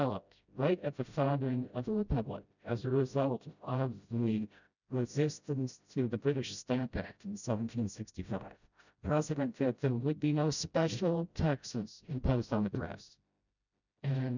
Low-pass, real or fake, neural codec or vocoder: 7.2 kHz; fake; codec, 16 kHz, 0.5 kbps, FreqCodec, smaller model